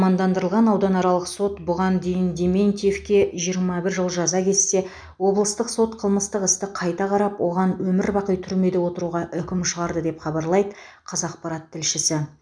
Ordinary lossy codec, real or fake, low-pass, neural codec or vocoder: none; real; 9.9 kHz; none